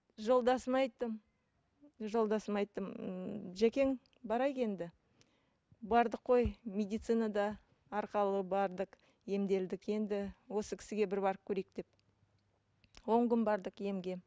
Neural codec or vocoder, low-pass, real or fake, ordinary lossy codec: none; none; real; none